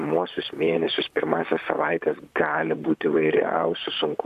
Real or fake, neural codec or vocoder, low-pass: fake; vocoder, 44.1 kHz, 128 mel bands, Pupu-Vocoder; 14.4 kHz